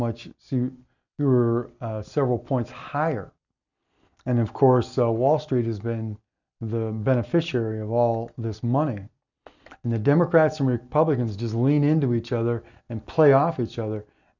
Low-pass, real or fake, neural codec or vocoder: 7.2 kHz; real; none